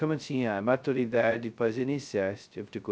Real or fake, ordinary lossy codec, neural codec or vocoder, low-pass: fake; none; codec, 16 kHz, 0.2 kbps, FocalCodec; none